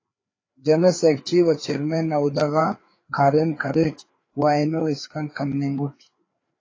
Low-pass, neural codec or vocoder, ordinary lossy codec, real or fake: 7.2 kHz; codec, 16 kHz, 4 kbps, FreqCodec, larger model; AAC, 32 kbps; fake